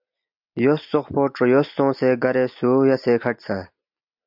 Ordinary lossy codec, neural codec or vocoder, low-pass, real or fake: MP3, 48 kbps; none; 5.4 kHz; real